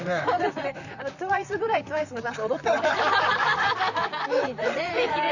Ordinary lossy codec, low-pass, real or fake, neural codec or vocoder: none; 7.2 kHz; fake; vocoder, 44.1 kHz, 128 mel bands, Pupu-Vocoder